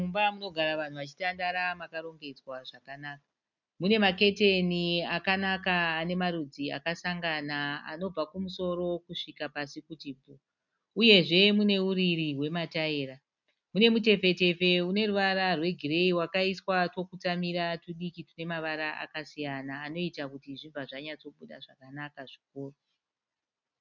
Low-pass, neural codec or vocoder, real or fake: 7.2 kHz; none; real